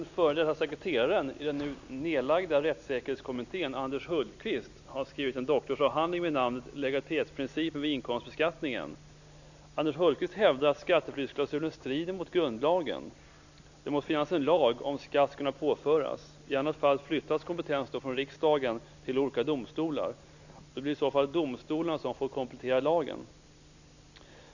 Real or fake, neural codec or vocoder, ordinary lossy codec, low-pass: real; none; none; 7.2 kHz